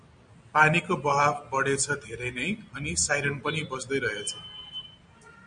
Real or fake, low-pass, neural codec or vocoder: real; 9.9 kHz; none